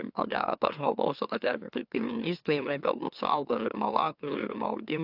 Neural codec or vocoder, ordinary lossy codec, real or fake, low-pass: autoencoder, 44.1 kHz, a latent of 192 numbers a frame, MeloTTS; AAC, 48 kbps; fake; 5.4 kHz